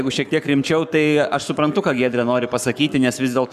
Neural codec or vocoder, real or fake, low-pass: codec, 44.1 kHz, 7.8 kbps, Pupu-Codec; fake; 14.4 kHz